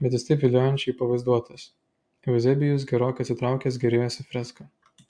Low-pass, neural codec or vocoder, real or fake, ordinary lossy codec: 9.9 kHz; none; real; AAC, 64 kbps